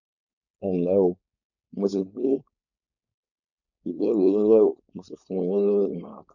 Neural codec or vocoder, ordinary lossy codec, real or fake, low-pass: codec, 16 kHz, 4.8 kbps, FACodec; none; fake; 7.2 kHz